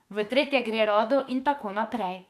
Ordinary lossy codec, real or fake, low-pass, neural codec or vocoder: none; fake; 14.4 kHz; autoencoder, 48 kHz, 32 numbers a frame, DAC-VAE, trained on Japanese speech